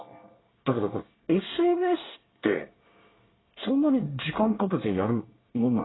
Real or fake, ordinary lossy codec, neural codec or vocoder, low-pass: fake; AAC, 16 kbps; codec, 24 kHz, 1 kbps, SNAC; 7.2 kHz